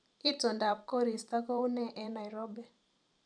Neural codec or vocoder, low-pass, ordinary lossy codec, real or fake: vocoder, 44.1 kHz, 128 mel bands every 512 samples, BigVGAN v2; 9.9 kHz; none; fake